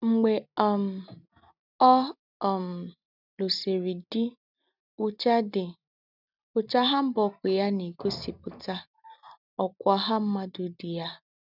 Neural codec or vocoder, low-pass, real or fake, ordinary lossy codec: none; 5.4 kHz; real; none